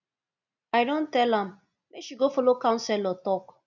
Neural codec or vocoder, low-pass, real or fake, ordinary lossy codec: none; 7.2 kHz; real; none